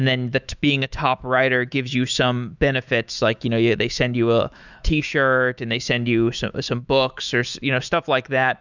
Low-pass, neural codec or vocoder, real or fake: 7.2 kHz; autoencoder, 48 kHz, 128 numbers a frame, DAC-VAE, trained on Japanese speech; fake